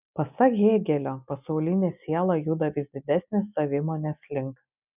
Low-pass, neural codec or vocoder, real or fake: 3.6 kHz; none; real